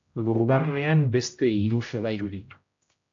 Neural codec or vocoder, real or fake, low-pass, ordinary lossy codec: codec, 16 kHz, 0.5 kbps, X-Codec, HuBERT features, trained on general audio; fake; 7.2 kHz; AAC, 48 kbps